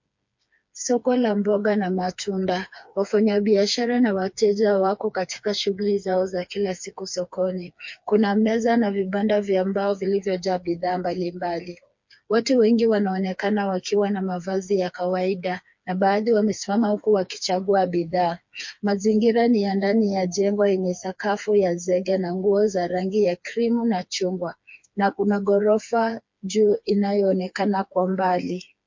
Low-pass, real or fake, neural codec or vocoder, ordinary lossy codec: 7.2 kHz; fake; codec, 16 kHz, 4 kbps, FreqCodec, smaller model; MP3, 48 kbps